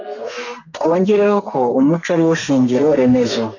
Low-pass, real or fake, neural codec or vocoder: 7.2 kHz; fake; codec, 32 kHz, 1.9 kbps, SNAC